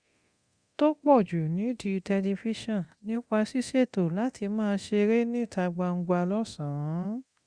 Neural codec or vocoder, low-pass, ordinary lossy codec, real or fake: codec, 24 kHz, 0.9 kbps, DualCodec; 9.9 kHz; Opus, 64 kbps; fake